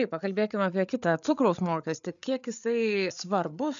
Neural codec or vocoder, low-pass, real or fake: codec, 16 kHz, 8 kbps, FreqCodec, larger model; 7.2 kHz; fake